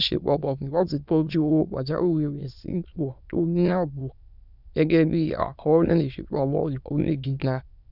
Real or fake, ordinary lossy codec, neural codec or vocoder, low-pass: fake; none; autoencoder, 22.05 kHz, a latent of 192 numbers a frame, VITS, trained on many speakers; 5.4 kHz